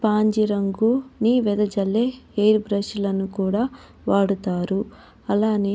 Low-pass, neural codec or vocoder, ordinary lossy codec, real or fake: none; none; none; real